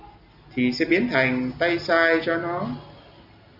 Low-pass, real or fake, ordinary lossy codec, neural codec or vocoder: 5.4 kHz; real; Opus, 64 kbps; none